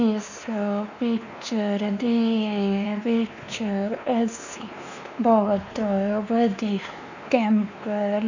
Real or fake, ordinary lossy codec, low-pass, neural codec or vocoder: fake; none; 7.2 kHz; codec, 16 kHz, 2 kbps, X-Codec, WavLM features, trained on Multilingual LibriSpeech